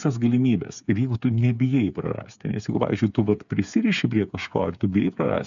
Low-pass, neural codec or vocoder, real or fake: 7.2 kHz; codec, 16 kHz, 8 kbps, FreqCodec, smaller model; fake